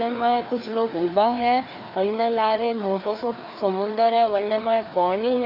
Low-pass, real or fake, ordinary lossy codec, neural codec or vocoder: 5.4 kHz; fake; none; codec, 16 kHz, 2 kbps, FreqCodec, larger model